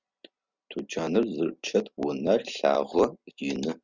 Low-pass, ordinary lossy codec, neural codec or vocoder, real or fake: 7.2 kHz; Opus, 64 kbps; none; real